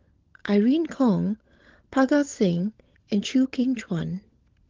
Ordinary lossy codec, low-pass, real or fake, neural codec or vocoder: Opus, 16 kbps; 7.2 kHz; fake; codec, 16 kHz, 16 kbps, FunCodec, trained on LibriTTS, 50 frames a second